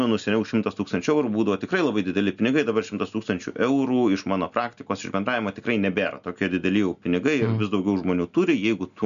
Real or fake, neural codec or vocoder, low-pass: real; none; 7.2 kHz